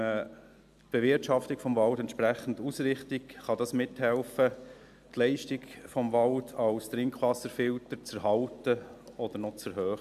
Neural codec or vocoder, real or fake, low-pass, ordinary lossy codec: none; real; 14.4 kHz; AAC, 96 kbps